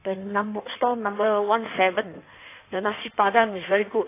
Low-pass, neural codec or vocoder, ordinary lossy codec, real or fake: 3.6 kHz; codec, 16 kHz in and 24 kHz out, 1.1 kbps, FireRedTTS-2 codec; AAC, 24 kbps; fake